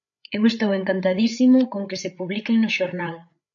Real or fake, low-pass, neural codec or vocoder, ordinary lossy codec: fake; 7.2 kHz; codec, 16 kHz, 8 kbps, FreqCodec, larger model; MP3, 64 kbps